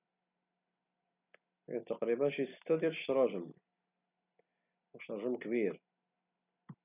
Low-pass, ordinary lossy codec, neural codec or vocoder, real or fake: 3.6 kHz; none; none; real